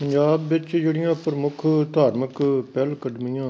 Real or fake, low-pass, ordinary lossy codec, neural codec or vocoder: real; none; none; none